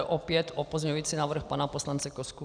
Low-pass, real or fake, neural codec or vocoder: 9.9 kHz; real; none